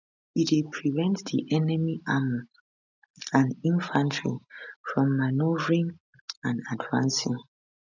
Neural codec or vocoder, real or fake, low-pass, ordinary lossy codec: none; real; 7.2 kHz; none